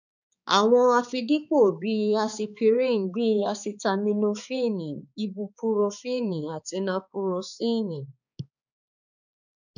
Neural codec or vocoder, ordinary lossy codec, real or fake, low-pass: codec, 16 kHz, 4 kbps, X-Codec, HuBERT features, trained on balanced general audio; none; fake; 7.2 kHz